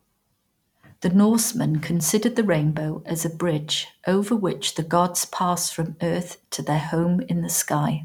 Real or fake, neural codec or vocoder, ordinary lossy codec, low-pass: fake; vocoder, 44.1 kHz, 128 mel bands every 512 samples, BigVGAN v2; none; 19.8 kHz